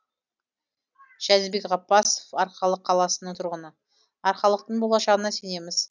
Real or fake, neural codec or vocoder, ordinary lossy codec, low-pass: real; none; none; 7.2 kHz